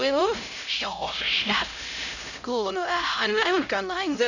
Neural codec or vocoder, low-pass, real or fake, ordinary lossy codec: codec, 16 kHz, 0.5 kbps, X-Codec, HuBERT features, trained on LibriSpeech; 7.2 kHz; fake; none